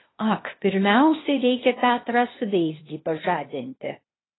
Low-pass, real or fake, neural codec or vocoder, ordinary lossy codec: 7.2 kHz; fake; codec, 16 kHz, 0.8 kbps, ZipCodec; AAC, 16 kbps